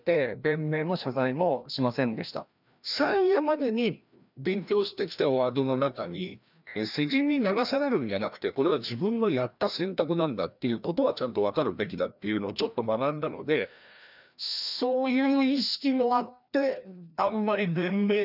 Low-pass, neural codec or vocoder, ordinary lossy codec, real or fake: 5.4 kHz; codec, 16 kHz, 1 kbps, FreqCodec, larger model; none; fake